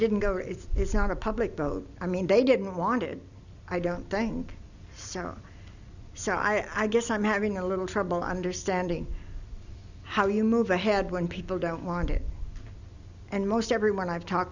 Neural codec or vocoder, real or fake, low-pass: none; real; 7.2 kHz